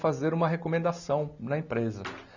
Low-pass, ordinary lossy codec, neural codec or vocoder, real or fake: 7.2 kHz; none; none; real